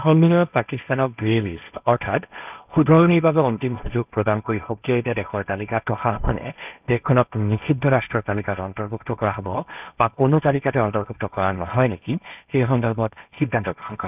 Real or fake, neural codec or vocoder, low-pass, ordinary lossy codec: fake; codec, 16 kHz, 1.1 kbps, Voila-Tokenizer; 3.6 kHz; none